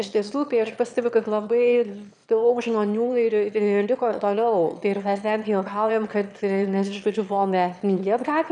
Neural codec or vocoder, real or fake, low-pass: autoencoder, 22.05 kHz, a latent of 192 numbers a frame, VITS, trained on one speaker; fake; 9.9 kHz